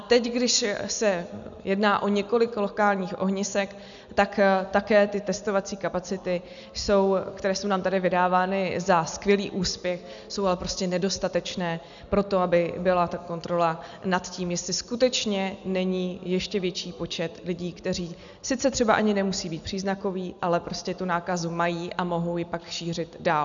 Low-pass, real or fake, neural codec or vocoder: 7.2 kHz; real; none